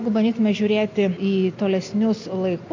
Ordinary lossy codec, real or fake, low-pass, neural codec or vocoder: MP3, 48 kbps; real; 7.2 kHz; none